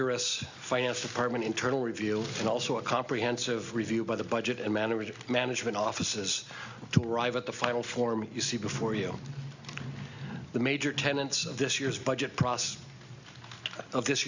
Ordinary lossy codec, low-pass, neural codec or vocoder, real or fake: Opus, 64 kbps; 7.2 kHz; none; real